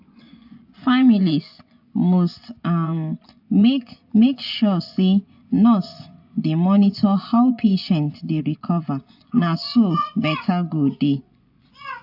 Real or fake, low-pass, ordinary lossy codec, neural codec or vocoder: fake; 5.4 kHz; AAC, 48 kbps; vocoder, 24 kHz, 100 mel bands, Vocos